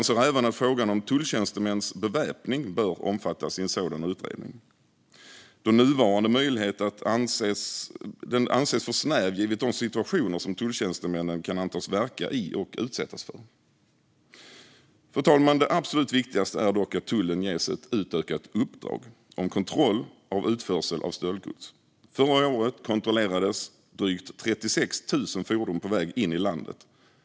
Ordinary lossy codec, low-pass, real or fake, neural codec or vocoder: none; none; real; none